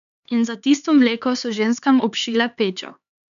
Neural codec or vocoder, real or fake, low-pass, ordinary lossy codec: codec, 16 kHz, 4 kbps, X-Codec, HuBERT features, trained on LibriSpeech; fake; 7.2 kHz; none